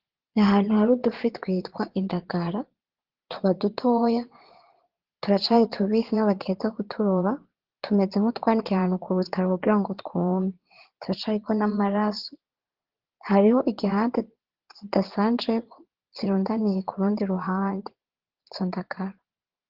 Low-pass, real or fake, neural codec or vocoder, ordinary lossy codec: 5.4 kHz; fake; vocoder, 22.05 kHz, 80 mel bands, Vocos; Opus, 16 kbps